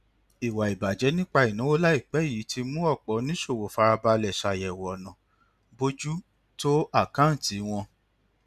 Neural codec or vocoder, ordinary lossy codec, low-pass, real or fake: none; none; 14.4 kHz; real